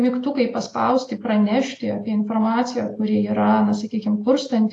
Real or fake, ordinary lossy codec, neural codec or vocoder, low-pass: real; AAC, 48 kbps; none; 10.8 kHz